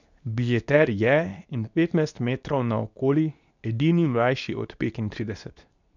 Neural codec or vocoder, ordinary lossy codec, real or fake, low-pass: codec, 24 kHz, 0.9 kbps, WavTokenizer, medium speech release version 2; none; fake; 7.2 kHz